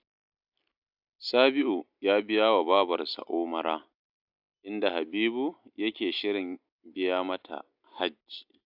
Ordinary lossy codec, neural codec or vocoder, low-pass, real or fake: AAC, 48 kbps; none; 5.4 kHz; real